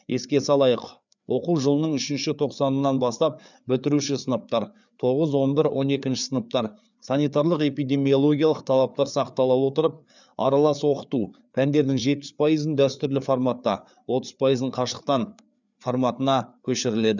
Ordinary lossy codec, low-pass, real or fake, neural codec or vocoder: none; 7.2 kHz; fake; codec, 16 kHz, 4 kbps, FreqCodec, larger model